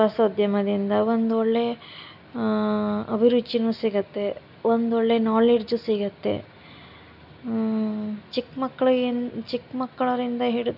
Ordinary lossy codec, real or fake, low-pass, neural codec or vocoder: none; real; 5.4 kHz; none